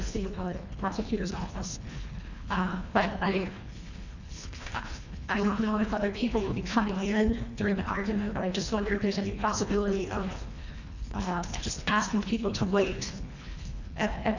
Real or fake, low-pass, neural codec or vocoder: fake; 7.2 kHz; codec, 24 kHz, 1.5 kbps, HILCodec